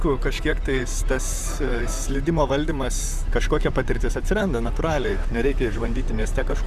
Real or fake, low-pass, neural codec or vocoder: fake; 14.4 kHz; vocoder, 44.1 kHz, 128 mel bands, Pupu-Vocoder